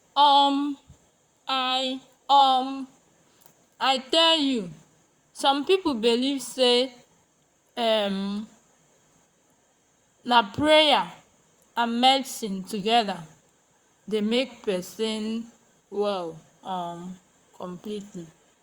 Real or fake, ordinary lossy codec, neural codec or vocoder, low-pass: fake; Opus, 64 kbps; vocoder, 44.1 kHz, 128 mel bands, Pupu-Vocoder; 19.8 kHz